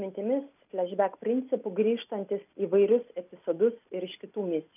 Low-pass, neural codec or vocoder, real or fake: 3.6 kHz; none; real